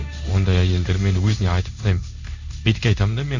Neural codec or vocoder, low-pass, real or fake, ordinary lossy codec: codec, 16 kHz in and 24 kHz out, 1 kbps, XY-Tokenizer; 7.2 kHz; fake; none